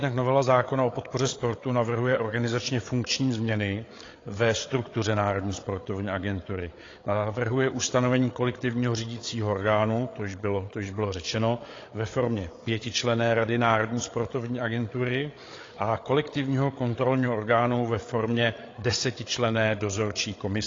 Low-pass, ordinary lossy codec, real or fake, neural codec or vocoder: 7.2 kHz; AAC, 32 kbps; fake; codec, 16 kHz, 8 kbps, FreqCodec, larger model